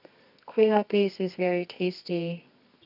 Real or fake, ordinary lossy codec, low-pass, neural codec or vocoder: fake; none; 5.4 kHz; codec, 24 kHz, 0.9 kbps, WavTokenizer, medium music audio release